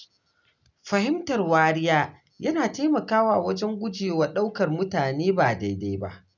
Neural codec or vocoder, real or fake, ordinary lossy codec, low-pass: none; real; none; 7.2 kHz